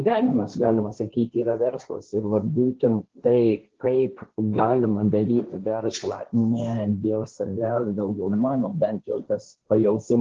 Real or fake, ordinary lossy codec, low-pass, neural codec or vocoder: fake; Opus, 16 kbps; 7.2 kHz; codec, 16 kHz, 1.1 kbps, Voila-Tokenizer